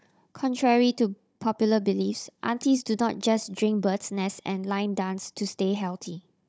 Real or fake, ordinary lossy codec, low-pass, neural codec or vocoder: fake; none; none; codec, 16 kHz, 16 kbps, FunCodec, trained on Chinese and English, 50 frames a second